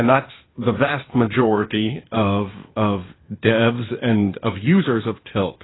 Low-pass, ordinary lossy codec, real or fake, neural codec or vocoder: 7.2 kHz; AAC, 16 kbps; fake; codec, 16 kHz, 0.8 kbps, ZipCodec